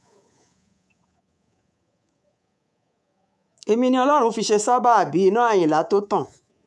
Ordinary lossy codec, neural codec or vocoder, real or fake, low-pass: none; codec, 24 kHz, 3.1 kbps, DualCodec; fake; none